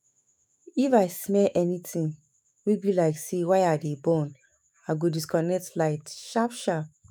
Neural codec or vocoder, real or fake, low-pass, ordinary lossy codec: autoencoder, 48 kHz, 128 numbers a frame, DAC-VAE, trained on Japanese speech; fake; none; none